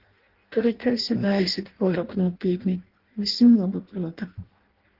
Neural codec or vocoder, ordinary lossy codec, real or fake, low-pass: codec, 16 kHz in and 24 kHz out, 0.6 kbps, FireRedTTS-2 codec; Opus, 16 kbps; fake; 5.4 kHz